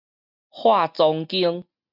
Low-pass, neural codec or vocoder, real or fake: 5.4 kHz; none; real